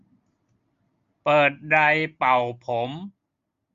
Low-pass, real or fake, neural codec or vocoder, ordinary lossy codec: 7.2 kHz; real; none; Opus, 64 kbps